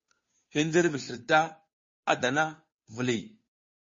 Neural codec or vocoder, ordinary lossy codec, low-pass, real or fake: codec, 16 kHz, 2 kbps, FunCodec, trained on Chinese and English, 25 frames a second; MP3, 32 kbps; 7.2 kHz; fake